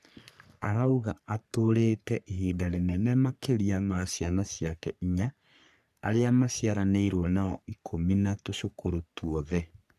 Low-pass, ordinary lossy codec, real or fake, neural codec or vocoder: 14.4 kHz; none; fake; codec, 44.1 kHz, 3.4 kbps, Pupu-Codec